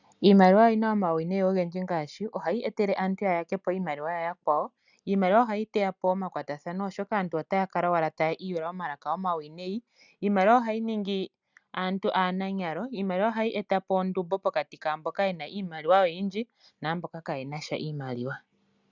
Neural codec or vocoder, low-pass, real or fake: none; 7.2 kHz; real